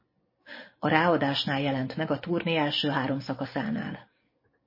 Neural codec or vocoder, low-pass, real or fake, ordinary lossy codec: none; 5.4 kHz; real; MP3, 24 kbps